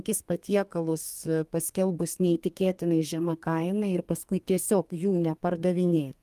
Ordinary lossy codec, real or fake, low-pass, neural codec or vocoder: Opus, 32 kbps; fake; 14.4 kHz; codec, 32 kHz, 1.9 kbps, SNAC